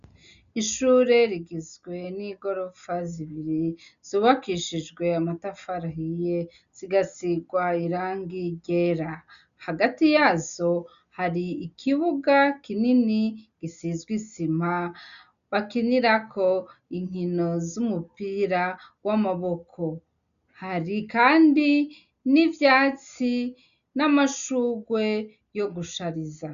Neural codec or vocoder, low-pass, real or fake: none; 7.2 kHz; real